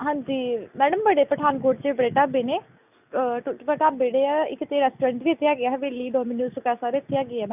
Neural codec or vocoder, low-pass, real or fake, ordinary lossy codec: none; 3.6 kHz; real; none